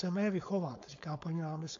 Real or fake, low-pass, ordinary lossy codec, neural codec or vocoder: fake; 7.2 kHz; AAC, 48 kbps; codec, 16 kHz, 4.8 kbps, FACodec